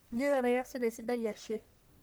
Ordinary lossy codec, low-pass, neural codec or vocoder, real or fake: none; none; codec, 44.1 kHz, 1.7 kbps, Pupu-Codec; fake